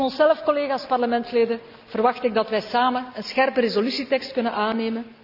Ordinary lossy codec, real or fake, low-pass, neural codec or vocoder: none; real; 5.4 kHz; none